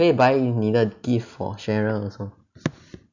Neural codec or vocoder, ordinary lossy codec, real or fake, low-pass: none; none; real; 7.2 kHz